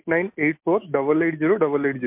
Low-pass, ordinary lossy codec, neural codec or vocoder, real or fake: 3.6 kHz; MP3, 32 kbps; none; real